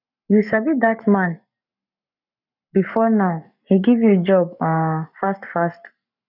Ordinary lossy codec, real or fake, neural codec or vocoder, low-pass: none; fake; codec, 44.1 kHz, 7.8 kbps, Pupu-Codec; 5.4 kHz